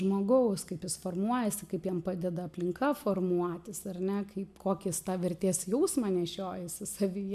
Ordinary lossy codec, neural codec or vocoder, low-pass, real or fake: MP3, 96 kbps; none; 14.4 kHz; real